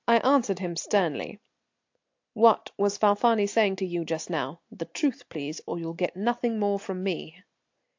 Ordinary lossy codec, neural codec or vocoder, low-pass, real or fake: AAC, 48 kbps; none; 7.2 kHz; real